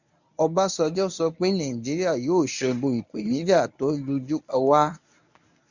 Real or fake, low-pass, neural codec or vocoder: fake; 7.2 kHz; codec, 24 kHz, 0.9 kbps, WavTokenizer, medium speech release version 1